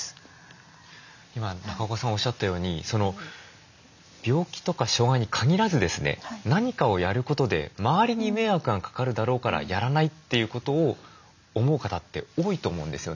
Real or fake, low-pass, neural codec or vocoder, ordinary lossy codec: real; 7.2 kHz; none; none